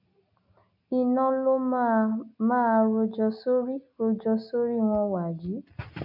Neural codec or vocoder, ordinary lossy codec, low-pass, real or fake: none; none; 5.4 kHz; real